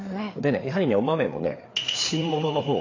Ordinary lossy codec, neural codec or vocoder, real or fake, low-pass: AAC, 32 kbps; codec, 16 kHz, 4 kbps, FreqCodec, larger model; fake; 7.2 kHz